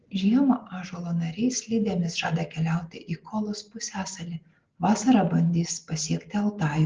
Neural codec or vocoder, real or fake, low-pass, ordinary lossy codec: none; real; 7.2 kHz; Opus, 16 kbps